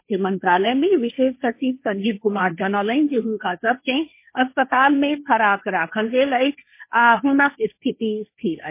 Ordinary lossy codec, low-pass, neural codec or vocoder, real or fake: MP3, 24 kbps; 3.6 kHz; codec, 16 kHz, 1.1 kbps, Voila-Tokenizer; fake